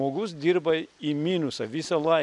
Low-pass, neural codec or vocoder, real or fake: 10.8 kHz; none; real